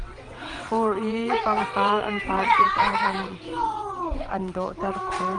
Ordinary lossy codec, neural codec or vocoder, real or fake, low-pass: Opus, 24 kbps; vocoder, 22.05 kHz, 80 mel bands, WaveNeXt; fake; 9.9 kHz